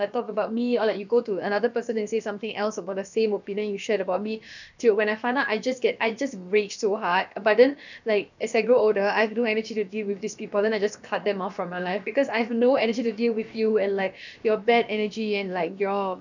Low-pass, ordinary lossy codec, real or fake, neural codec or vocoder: 7.2 kHz; none; fake; codec, 16 kHz, about 1 kbps, DyCAST, with the encoder's durations